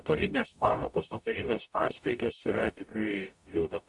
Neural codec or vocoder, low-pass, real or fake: codec, 44.1 kHz, 0.9 kbps, DAC; 10.8 kHz; fake